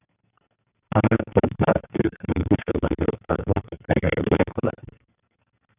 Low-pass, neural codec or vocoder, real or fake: 3.6 kHz; codec, 44.1 kHz, 2.6 kbps, SNAC; fake